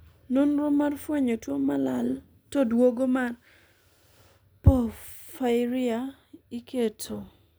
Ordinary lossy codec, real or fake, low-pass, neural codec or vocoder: none; real; none; none